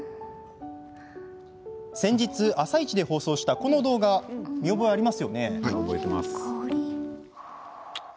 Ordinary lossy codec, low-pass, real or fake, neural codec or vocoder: none; none; real; none